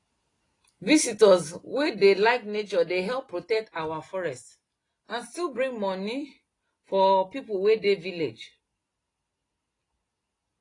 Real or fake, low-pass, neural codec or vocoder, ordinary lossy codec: real; 10.8 kHz; none; AAC, 32 kbps